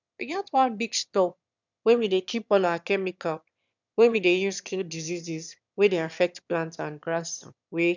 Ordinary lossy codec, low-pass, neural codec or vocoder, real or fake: none; 7.2 kHz; autoencoder, 22.05 kHz, a latent of 192 numbers a frame, VITS, trained on one speaker; fake